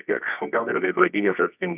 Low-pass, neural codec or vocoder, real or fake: 3.6 kHz; codec, 24 kHz, 0.9 kbps, WavTokenizer, medium music audio release; fake